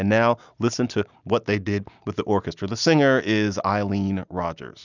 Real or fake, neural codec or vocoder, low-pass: real; none; 7.2 kHz